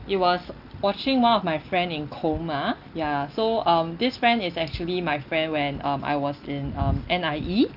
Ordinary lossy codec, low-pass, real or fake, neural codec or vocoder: Opus, 24 kbps; 5.4 kHz; real; none